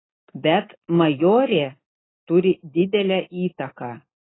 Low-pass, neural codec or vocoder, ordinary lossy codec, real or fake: 7.2 kHz; none; AAC, 16 kbps; real